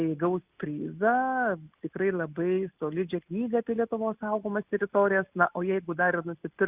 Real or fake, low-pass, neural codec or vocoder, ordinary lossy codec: real; 3.6 kHz; none; Opus, 64 kbps